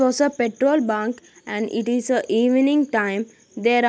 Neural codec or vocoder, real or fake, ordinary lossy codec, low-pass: none; real; none; none